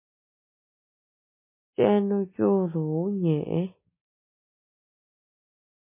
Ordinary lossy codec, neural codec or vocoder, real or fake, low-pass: MP3, 24 kbps; none; real; 3.6 kHz